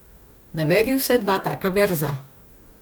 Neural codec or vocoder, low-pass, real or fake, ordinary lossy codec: codec, 44.1 kHz, 2.6 kbps, DAC; none; fake; none